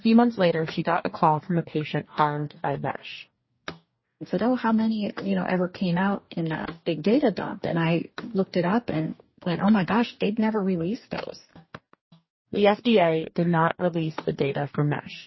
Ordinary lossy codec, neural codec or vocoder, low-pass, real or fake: MP3, 24 kbps; codec, 44.1 kHz, 2.6 kbps, DAC; 7.2 kHz; fake